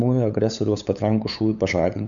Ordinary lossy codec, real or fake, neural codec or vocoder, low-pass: MP3, 96 kbps; fake; codec, 16 kHz, 8 kbps, FunCodec, trained on LibriTTS, 25 frames a second; 7.2 kHz